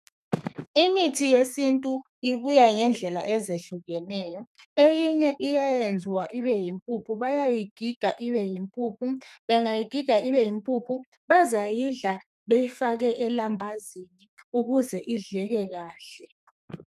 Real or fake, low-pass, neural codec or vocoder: fake; 14.4 kHz; codec, 32 kHz, 1.9 kbps, SNAC